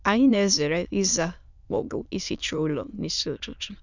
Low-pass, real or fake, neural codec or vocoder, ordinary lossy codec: 7.2 kHz; fake; autoencoder, 22.05 kHz, a latent of 192 numbers a frame, VITS, trained on many speakers; none